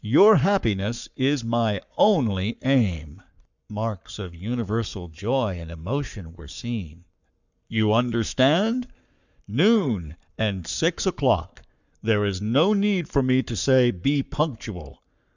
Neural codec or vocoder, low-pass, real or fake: codec, 44.1 kHz, 7.8 kbps, Pupu-Codec; 7.2 kHz; fake